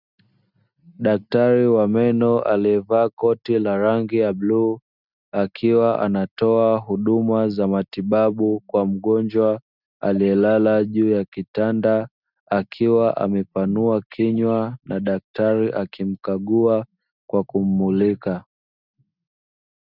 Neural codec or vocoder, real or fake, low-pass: none; real; 5.4 kHz